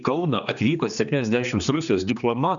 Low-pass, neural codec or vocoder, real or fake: 7.2 kHz; codec, 16 kHz, 2 kbps, X-Codec, HuBERT features, trained on general audio; fake